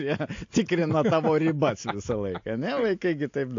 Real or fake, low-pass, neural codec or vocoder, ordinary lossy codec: real; 7.2 kHz; none; AAC, 48 kbps